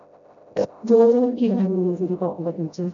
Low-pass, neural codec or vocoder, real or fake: 7.2 kHz; codec, 16 kHz, 0.5 kbps, FreqCodec, smaller model; fake